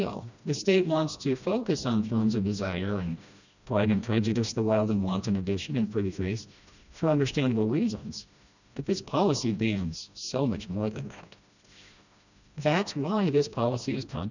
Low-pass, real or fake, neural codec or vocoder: 7.2 kHz; fake; codec, 16 kHz, 1 kbps, FreqCodec, smaller model